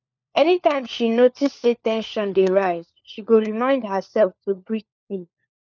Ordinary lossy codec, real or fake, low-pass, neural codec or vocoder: none; fake; 7.2 kHz; codec, 16 kHz, 4 kbps, FunCodec, trained on LibriTTS, 50 frames a second